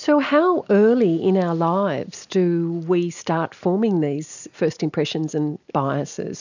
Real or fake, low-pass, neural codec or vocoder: real; 7.2 kHz; none